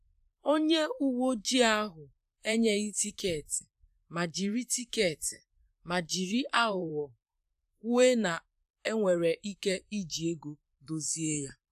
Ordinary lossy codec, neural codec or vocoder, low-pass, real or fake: AAC, 96 kbps; vocoder, 44.1 kHz, 128 mel bands, Pupu-Vocoder; 14.4 kHz; fake